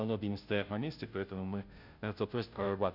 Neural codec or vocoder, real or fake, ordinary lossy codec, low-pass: codec, 16 kHz, 0.5 kbps, FunCodec, trained on Chinese and English, 25 frames a second; fake; none; 5.4 kHz